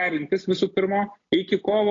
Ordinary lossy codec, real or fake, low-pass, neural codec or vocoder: AAC, 32 kbps; real; 7.2 kHz; none